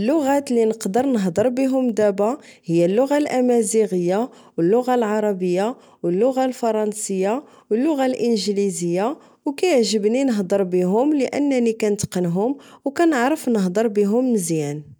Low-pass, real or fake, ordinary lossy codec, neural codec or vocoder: none; real; none; none